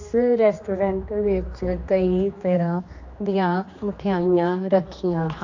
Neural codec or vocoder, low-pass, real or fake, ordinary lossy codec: codec, 16 kHz, 2 kbps, X-Codec, HuBERT features, trained on general audio; 7.2 kHz; fake; AAC, 48 kbps